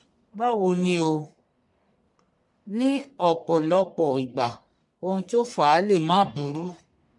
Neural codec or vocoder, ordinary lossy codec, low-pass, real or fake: codec, 44.1 kHz, 1.7 kbps, Pupu-Codec; MP3, 64 kbps; 10.8 kHz; fake